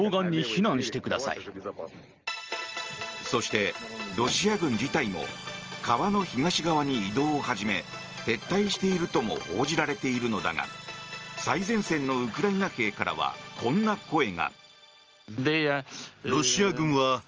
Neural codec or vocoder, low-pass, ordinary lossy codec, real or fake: none; 7.2 kHz; Opus, 24 kbps; real